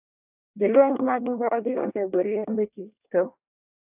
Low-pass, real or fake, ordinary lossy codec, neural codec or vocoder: 3.6 kHz; fake; AAC, 32 kbps; codec, 24 kHz, 1 kbps, SNAC